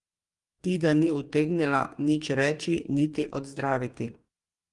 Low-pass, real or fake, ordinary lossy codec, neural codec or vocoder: 10.8 kHz; fake; Opus, 32 kbps; codec, 44.1 kHz, 2.6 kbps, DAC